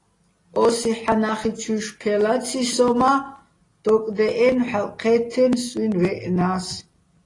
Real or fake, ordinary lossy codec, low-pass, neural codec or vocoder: real; AAC, 32 kbps; 10.8 kHz; none